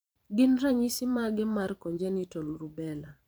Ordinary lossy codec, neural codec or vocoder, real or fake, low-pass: none; vocoder, 44.1 kHz, 128 mel bands every 512 samples, BigVGAN v2; fake; none